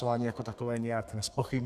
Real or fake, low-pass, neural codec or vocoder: fake; 14.4 kHz; codec, 32 kHz, 1.9 kbps, SNAC